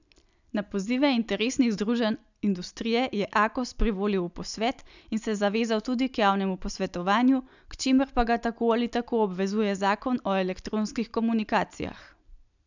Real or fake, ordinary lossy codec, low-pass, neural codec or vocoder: real; none; 7.2 kHz; none